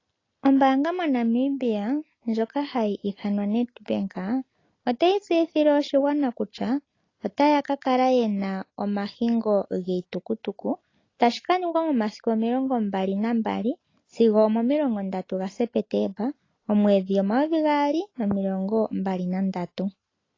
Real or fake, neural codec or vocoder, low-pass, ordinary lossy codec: real; none; 7.2 kHz; AAC, 32 kbps